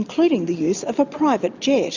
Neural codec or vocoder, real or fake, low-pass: none; real; 7.2 kHz